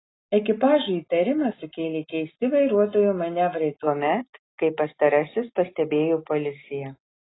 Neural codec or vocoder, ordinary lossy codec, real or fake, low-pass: none; AAC, 16 kbps; real; 7.2 kHz